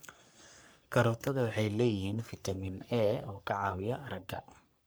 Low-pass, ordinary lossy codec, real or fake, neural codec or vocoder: none; none; fake; codec, 44.1 kHz, 3.4 kbps, Pupu-Codec